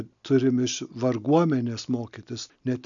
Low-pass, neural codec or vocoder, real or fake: 7.2 kHz; none; real